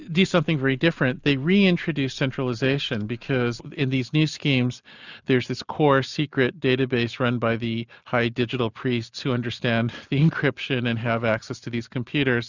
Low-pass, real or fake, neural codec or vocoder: 7.2 kHz; real; none